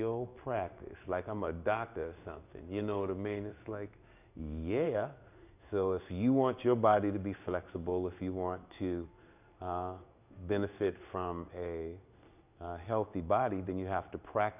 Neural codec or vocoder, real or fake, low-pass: codec, 16 kHz in and 24 kHz out, 1 kbps, XY-Tokenizer; fake; 3.6 kHz